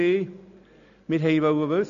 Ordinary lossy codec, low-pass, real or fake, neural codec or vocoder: none; 7.2 kHz; real; none